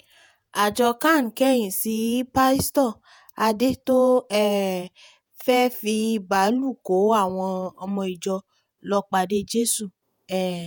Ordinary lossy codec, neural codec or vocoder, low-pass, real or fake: none; vocoder, 48 kHz, 128 mel bands, Vocos; none; fake